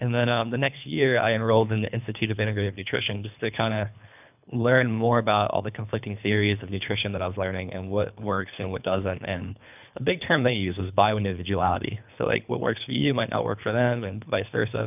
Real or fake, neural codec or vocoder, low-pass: fake; codec, 24 kHz, 3 kbps, HILCodec; 3.6 kHz